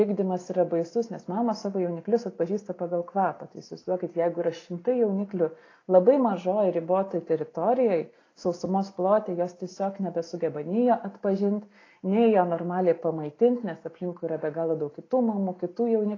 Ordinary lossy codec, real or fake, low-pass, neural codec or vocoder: AAC, 32 kbps; real; 7.2 kHz; none